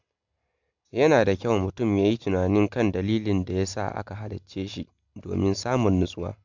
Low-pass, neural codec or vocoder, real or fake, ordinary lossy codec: 7.2 kHz; none; real; MP3, 64 kbps